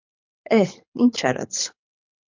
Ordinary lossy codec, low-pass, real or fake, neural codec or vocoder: MP3, 48 kbps; 7.2 kHz; fake; codec, 24 kHz, 6 kbps, HILCodec